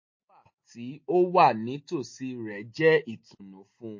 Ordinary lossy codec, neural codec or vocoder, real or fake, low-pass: MP3, 32 kbps; none; real; 7.2 kHz